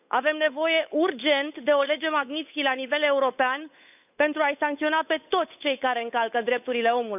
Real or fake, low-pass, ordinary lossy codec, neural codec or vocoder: fake; 3.6 kHz; none; codec, 16 kHz, 8 kbps, FunCodec, trained on Chinese and English, 25 frames a second